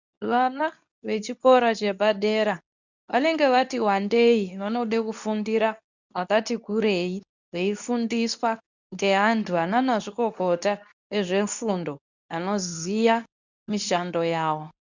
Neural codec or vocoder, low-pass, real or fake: codec, 24 kHz, 0.9 kbps, WavTokenizer, medium speech release version 2; 7.2 kHz; fake